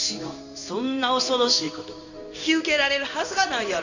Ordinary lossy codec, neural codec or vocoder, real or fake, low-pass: none; codec, 16 kHz in and 24 kHz out, 1 kbps, XY-Tokenizer; fake; 7.2 kHz